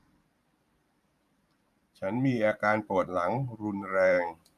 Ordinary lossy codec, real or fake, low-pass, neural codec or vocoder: none; fake; 14.4 kHz; vocoder, 44.1 kHz, 128 mel bands every 512 samples, BigVGAN v2